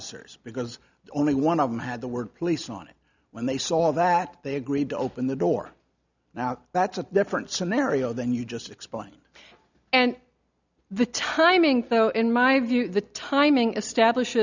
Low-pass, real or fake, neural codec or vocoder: 7.2 kHz; real; none